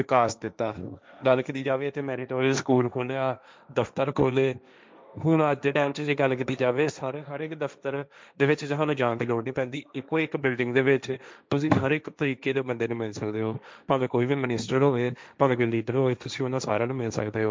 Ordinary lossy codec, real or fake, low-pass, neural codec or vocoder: none; fake; none; codec, 16 kHz, 1.1 kbps, Voila-Tokenizer